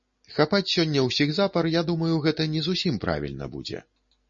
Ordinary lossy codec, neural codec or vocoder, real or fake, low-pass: MP3, 32 kbps; none; real; 7.2 kHz